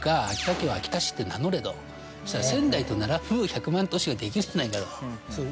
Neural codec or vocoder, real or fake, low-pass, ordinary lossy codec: none; real; none; none